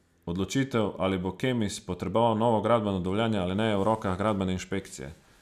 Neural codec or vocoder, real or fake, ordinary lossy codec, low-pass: none; real; none; 14.4 kHz